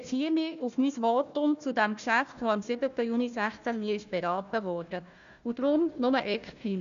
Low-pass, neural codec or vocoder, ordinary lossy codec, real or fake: 7.2 kHz; codec, 16 kHz, 1 kbps, FunCodec, trained on Chinese and English, 50 frames a second; none; fake